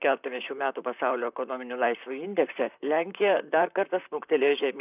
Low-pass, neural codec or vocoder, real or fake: 3.6 kHz; vocoder, 44.1 kHz, 128 mel bands, Pupu-Vocoder; fake